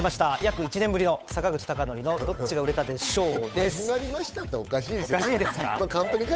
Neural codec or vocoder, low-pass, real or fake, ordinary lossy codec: codec, 16 kHz, 8 kbps, FunCodec, trained on Chinese and English, 25 frames a second; none; fake; none